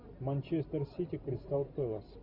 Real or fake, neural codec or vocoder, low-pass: real; none; 5.4 kHz